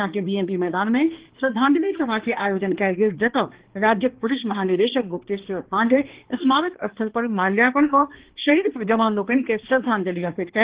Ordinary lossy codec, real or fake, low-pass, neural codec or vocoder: Opus, 16 kbps; fake; 3.6 kHz; codec, 16 kHz, 2 kbps, X-Codec, HuBERT features, trained on balanced general audio